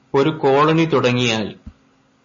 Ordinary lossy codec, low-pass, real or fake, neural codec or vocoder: MP3, 32 kbps; 7.2 kHz; real; none